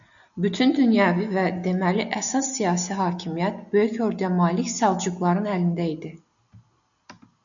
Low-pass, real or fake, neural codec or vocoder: 7.2 kHz; real; none